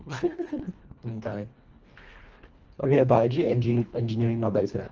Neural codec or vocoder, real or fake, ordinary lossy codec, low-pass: codec, 24 kHz, 1.5 kbps, HILCodec; fake; Opus, 24 kbps; 7.2 kHz